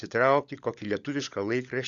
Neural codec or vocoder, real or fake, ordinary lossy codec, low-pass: codec, 16 kHz, 16 kbps, FunCodec, trained on LibriTTS, 50 frames a second; fake; Opus, 64 kbps; 7.2 kHz